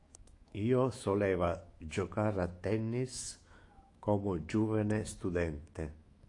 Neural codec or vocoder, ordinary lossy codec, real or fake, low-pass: autoencoder, 48 kHz, 128 numbers a frame, DAC-VAE, trained on Japanese speech; AAC, 48 kbps; fake; 10.8 kHz